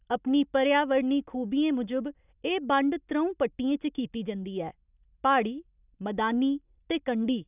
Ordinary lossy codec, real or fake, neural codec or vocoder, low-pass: none; real; none; 3.6 kHz